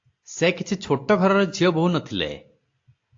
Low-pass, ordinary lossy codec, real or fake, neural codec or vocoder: 7.2 kHz; AAC, 48 kbps; real; none